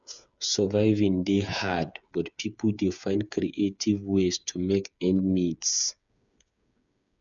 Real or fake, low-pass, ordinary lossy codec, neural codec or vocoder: fake; 7.2 kHz; MP3, 96 kbps; codec, 16 kHz, 8 kbps, FreqCodec, smaller model